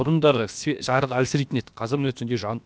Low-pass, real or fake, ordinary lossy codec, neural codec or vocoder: none; fake; none; codec, 16 kHz, about 1 kbps, DyCAST, with the encoder's durations